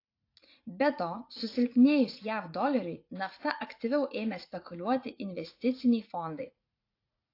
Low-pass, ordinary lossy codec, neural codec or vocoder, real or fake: 5.4 kHz; AAC, 32 kbps; vocoder, 44.1 kHz, 80 mel bands, Vocos; fake